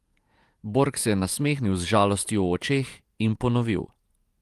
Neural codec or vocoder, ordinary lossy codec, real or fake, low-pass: vocoder, 48 kHz, 128 mel bands, Vocos; Opus, 32 kbps; fake; 14.4 kHz